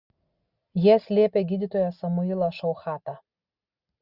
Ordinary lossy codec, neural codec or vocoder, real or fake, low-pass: Opus, 64 kbps; none; real; 5.4 kHz